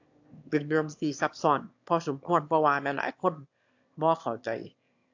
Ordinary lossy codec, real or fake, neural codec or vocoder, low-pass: AAC, 48 kbps; fake; autoencoder, 22.05 kHz, a latent of 192 numbers a frame, VITS, trained on one speaker; 7.2 kHz